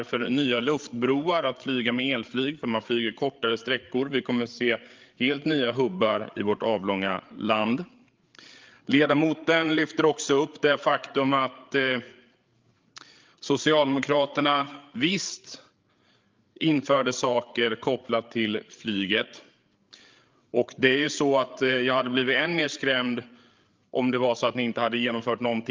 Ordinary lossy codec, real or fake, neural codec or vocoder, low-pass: Opus, 32 kbps; fake; codec, 16 kHz, 8 kbps, FreqCodec, larger model; 7.2 kHz